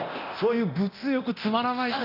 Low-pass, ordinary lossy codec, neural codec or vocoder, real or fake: 5.4 kHz; none; codec, 24 kHz, 0.9 kbps, DualCodec; fake